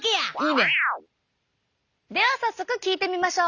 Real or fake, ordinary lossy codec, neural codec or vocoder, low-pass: real; none; none; 7.2 kHz